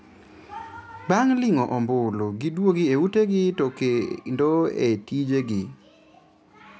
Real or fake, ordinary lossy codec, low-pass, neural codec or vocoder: real; none; none; none